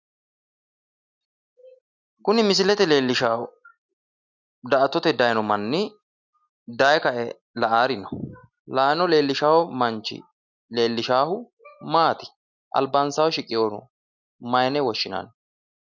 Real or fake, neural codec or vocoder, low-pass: real; none; 7.2 kHz